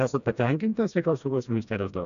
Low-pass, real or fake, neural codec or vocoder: 7.2 kHz; fake; codec, 16 kHz, 1 kbps, FreqCodec, smaller model